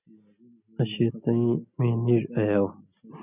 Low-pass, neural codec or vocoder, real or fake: 3.6 kHz; none; real